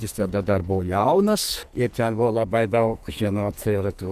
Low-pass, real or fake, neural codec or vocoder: 14.4 kHz; fake; codec, 44.1 kHz, 2.6 kbps, SNAC